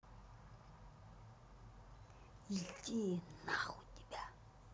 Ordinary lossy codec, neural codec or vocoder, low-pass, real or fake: none; none; none; real